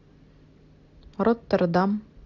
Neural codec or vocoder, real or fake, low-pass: none; real; 7.2 kHz